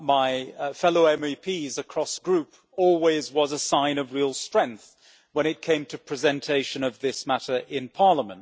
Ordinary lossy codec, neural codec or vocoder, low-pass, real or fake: none; none; none; real